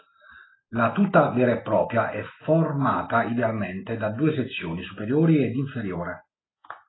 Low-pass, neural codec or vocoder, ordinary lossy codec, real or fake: 7.2 kHz; none; AAC, 16 kbps; real